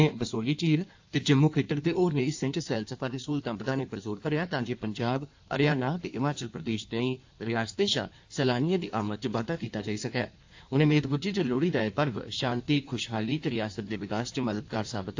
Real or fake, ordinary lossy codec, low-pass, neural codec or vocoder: fake; AAC, 48 kbps; 7.2 kHz; codec, 16 kHz in and 24 kHz out, 1.1 kbps, FireRedTTS-2 codec